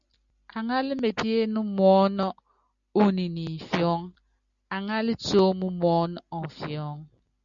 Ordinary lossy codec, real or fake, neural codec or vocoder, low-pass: MP3, 96 kbps; real; none; 7.2 kHz